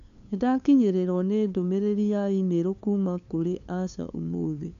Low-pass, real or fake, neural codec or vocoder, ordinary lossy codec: 7.2 kHz; fake; codec, 16 kHz, 2 kbps, FunCodec, trained on LibriTTS, 25 frames a second; none